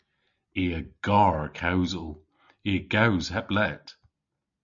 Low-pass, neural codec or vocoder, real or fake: 7.2 kHz; none; real